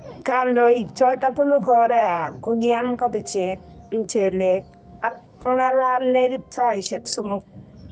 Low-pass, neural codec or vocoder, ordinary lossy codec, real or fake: 10.8 kHz; codec, 24 kHz, 0.9 kbps, WavTokenizer, medium music audio release; none; fake